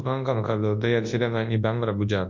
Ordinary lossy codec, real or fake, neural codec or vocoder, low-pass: MP3, 48 kbps; fake; codec, 24 kHz, 0.9 kbps, WavTokenizer, large speech release; 7.2 kHz